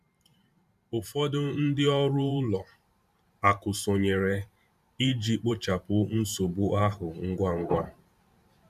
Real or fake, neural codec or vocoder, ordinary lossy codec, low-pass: fake; vocoder, 44.1 kHz, 128 mel bands every 512 samples, BigVGAN v2; MP3, 96 kbps; 14.4 kHz